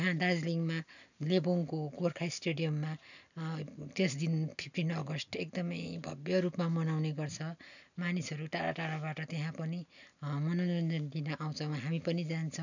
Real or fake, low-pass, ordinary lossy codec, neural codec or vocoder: real; 7.2 kHz; none; none